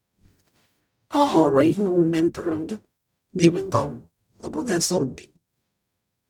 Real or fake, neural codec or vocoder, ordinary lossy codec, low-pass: fake; codec, 44.1 kHz, 0.9 kbps, DAC; none; 19.8 kHz